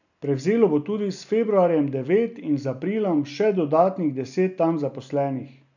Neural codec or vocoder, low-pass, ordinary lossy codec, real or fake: none; 7.2 kHz; none; real